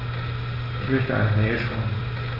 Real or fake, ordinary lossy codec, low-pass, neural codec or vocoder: real; none; 5.4 kHz; none